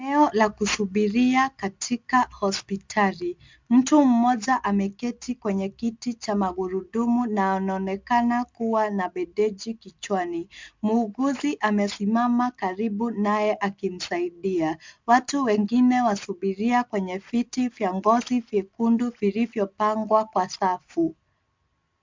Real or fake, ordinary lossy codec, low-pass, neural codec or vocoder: real; MP3, 64 kbps; 7.2 kHz; none